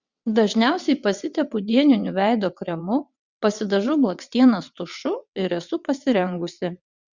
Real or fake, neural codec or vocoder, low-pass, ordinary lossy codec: fake; vocoder, 22.05 kHz, 80 mel bands, WaveNeXt; 7.2 kHz; Opus, 64 kbps